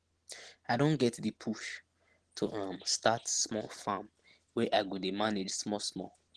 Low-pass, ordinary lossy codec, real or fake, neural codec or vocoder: 10.8 kHz; Opus, 16 kbps; real; none